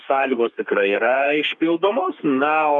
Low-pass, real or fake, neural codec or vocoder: 10.8 kHz; fake; codec, 32 kHz, 1.9 kbps, SNAC